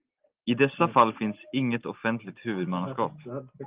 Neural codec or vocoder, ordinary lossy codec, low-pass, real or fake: none; Opus, 24 kbps; 3.6 kHz; real